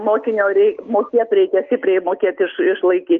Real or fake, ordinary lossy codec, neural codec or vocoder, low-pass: real; Opus, 24 kbps; none; 7.2 kHz